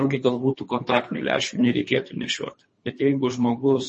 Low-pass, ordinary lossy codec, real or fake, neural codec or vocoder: 10.8 kHz; MP3, 32 kbps; fake; codec, 24 kHz, 3 kbps, HILCodec